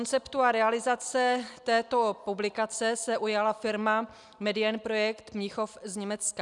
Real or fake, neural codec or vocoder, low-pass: real; none; 10.8 kHz